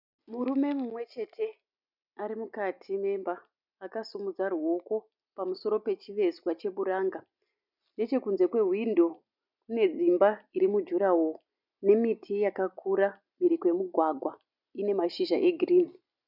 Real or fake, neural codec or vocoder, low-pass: real; none; 5.4 kHz